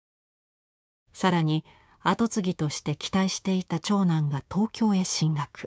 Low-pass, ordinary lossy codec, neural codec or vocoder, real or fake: none; none; codec, 16 kHz, 6 kbps, DAC; fake